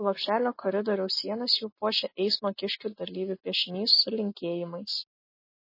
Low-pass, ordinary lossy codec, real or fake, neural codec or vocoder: 5.4 kHz; MP3, 24 kbps; real; none